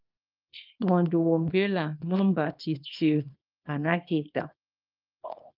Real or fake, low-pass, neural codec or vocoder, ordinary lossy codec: fake; 5.4 kHz; codec, 24 kHz, 0.9 kbps, WavTokenizer, small release; Opus, 32 kbps